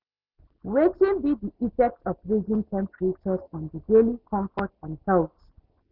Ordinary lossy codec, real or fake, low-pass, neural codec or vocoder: Opus, 64 kbps; real; 5.4 kHz; none